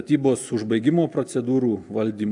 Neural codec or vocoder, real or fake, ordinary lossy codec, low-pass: none; real; AAC, 64 kbps; 10.8 kHz